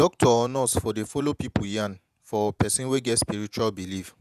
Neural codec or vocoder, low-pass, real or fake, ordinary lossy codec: vocoder, 48 kHz, 128 mel bands, Vocos; 14.4 kHz; fake; none